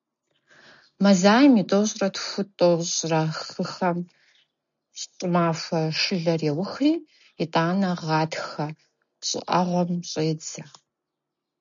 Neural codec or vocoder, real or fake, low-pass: none; real; 7.2 kHz